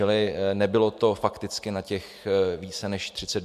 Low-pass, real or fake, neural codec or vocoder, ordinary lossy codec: 14.4 kHz; real; none; MP3, 96 kbps